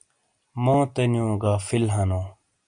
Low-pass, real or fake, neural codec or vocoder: 9.9 kHz; real; none